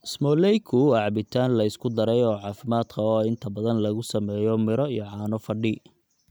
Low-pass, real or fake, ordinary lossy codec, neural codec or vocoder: none; real; none; none